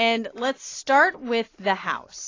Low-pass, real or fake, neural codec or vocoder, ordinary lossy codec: 7.2 kHz; real; none; AAC, 32 kbps